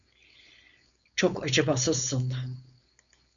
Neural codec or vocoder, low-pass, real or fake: codec, 16 kHz, 4.8 kbps, FACodec; 7.2 kHz; fake